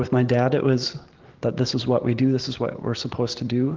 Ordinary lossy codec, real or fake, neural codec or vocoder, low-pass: Opus, 16 kbps; real; none; 7.2 kHz